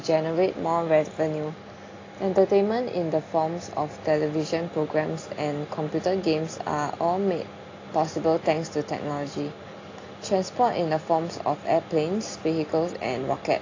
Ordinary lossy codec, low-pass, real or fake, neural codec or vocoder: AAC, 32 kbps; 7.2 kHz; real; none